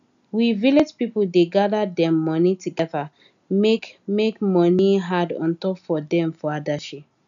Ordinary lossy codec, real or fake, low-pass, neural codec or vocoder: none; real; 7.2 kHz; none